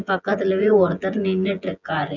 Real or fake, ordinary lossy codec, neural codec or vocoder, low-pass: fake; none; vocoder, 24 kHz, 100 mel bands, Vocos; 7.2 kHz